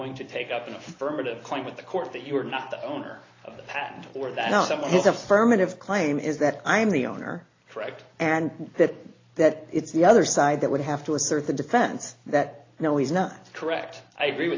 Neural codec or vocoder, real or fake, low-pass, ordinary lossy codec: none; real; 7.2 kHz; AAC, 32 kbps